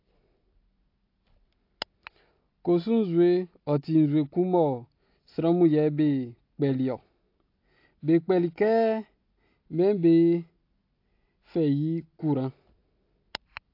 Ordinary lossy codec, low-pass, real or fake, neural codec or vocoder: AAC, 32 kbps; 5.4 kHz; real; none